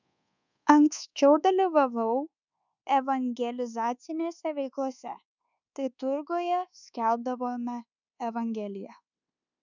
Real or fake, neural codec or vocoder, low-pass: fake; codec, 24 kHz, 1.2 kbps, DualCodec; 7.2 kHz